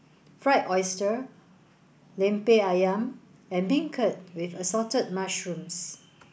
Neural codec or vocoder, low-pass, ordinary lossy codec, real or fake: none; none; none; real